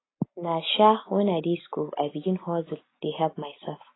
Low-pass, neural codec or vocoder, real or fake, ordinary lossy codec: 7.2 kHz; none; real; AAC, 16 kbps